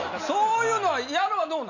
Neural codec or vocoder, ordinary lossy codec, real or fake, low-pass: none; none; real; 7.2 kHz